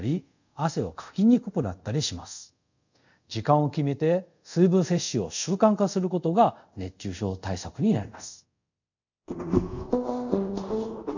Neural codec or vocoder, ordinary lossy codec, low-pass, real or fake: codec, 24 kHz, 0.5 kbps, DualCodec; none; 7.2 kHz; fake